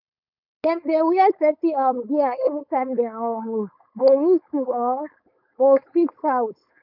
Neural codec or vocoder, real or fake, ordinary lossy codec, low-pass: codec, 16 kHz, 4.8 kbps, FACodec; fake; none; 5.4 kHz